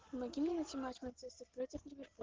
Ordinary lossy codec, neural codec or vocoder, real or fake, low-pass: Opus, 16 kbps; none; real; 7.2 kHz